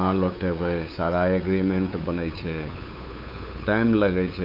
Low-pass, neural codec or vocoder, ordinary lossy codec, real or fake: 5.4 kHz; codec, 16 kHz, 8 kbps, FunCodec, trained on LibriTTS, 25 frames a second; none; fake